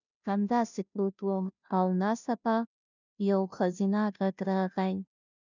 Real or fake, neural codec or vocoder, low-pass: fake; codec, 16 kHz, 0.5 kbps, FunCodec, trained on Chinese and English, 25 frames a second; 7.2 kHz